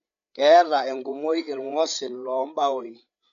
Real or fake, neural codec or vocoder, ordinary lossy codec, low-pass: fake; codec, 16 kHz, 8 kbps, FreqCodec, larger model; none; 7.2 kHz